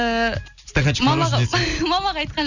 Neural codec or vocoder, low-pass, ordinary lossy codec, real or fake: none; 7.2 kHz; none; real